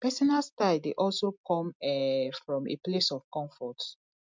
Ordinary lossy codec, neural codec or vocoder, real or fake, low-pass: MP3, 64 kbps; none; real; 7.2 kHz